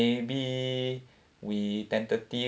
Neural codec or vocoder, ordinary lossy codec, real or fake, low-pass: none; none; real; none